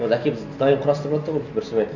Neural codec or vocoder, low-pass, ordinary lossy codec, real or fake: none; 7.2 kHz; none; real